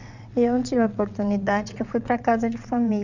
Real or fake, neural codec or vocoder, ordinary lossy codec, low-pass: fake; vocoder, 22.05 kHz, 80 mel bands, WaveNeXt; none; 7.2 kHz